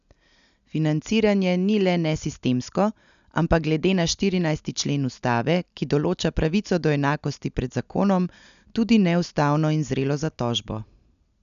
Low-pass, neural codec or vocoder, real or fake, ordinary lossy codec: 7.2 kHz; none; real; none